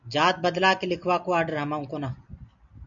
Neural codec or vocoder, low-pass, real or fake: none; 7.2 kHz; real